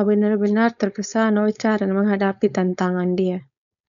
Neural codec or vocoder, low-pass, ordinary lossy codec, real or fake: codec, 16 kHz, 4.8 kbps, FACodec; 7.2 kHz; none; fake